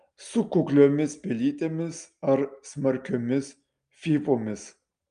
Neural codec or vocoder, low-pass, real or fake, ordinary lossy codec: none; 9.9 kHz; real; Opus, 32 kbps